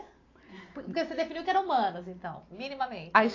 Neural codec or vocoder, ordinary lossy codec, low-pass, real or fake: none; AAC, 32 kbps; 7.2 kHz; real